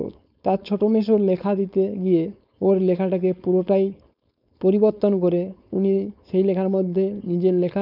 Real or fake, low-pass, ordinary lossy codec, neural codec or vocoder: fake; 5.4 kHz; none; codec, 16 kHz, 4.8 kbps, FACodec